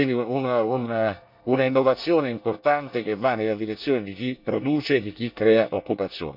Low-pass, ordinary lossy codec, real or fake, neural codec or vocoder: 5.4 kHz; none; fake; codec, 24 kHz, 1 kbps, SNAC